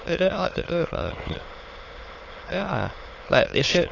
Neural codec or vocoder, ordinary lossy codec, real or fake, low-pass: autoencoder, 22.05 kHz, a latent of 192 numbers a frame, VITS, trained on many speakers; AAC, 32 kbps; fake; 7.2 kHz